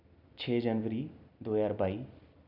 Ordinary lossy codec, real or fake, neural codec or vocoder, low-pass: none; real; none; 5.4 kHz